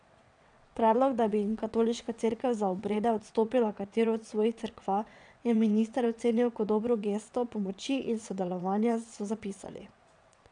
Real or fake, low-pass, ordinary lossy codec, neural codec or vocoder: fake; 9.9 kHz; none; vocoder, 22.05 kHz, 80 mel bands, WaveNeXt